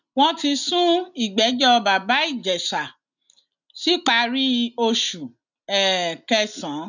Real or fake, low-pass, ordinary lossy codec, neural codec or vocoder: real; 7.2 kHz; none; none